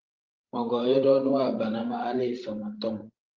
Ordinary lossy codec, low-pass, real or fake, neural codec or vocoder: Opus, 32 kbps; 7.2 kHz; fake; codec, 16 kHz, 16 kbps, FreqCodec, larger model